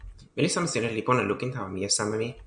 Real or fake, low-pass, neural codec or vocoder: real; 9.9 kHz; none